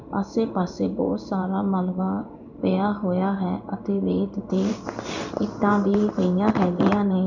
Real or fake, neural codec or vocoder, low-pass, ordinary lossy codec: fake; codec, 16 kHz in and 24 kHz out, 1 kbps, XY-Tokenizer; 7.2 kHz; none